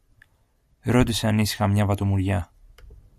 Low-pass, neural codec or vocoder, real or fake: 14.4 kHz; none; real